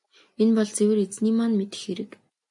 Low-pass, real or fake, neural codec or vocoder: 10.8 kHz; real; none